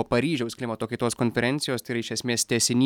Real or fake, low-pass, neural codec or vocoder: real; 19.8 kHz; none